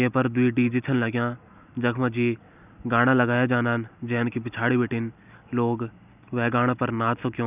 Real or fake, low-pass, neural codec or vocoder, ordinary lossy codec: real; 3.6 kHz; none; none